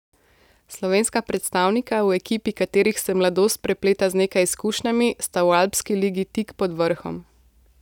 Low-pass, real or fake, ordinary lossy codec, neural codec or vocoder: 19.8 kHz; real; none; none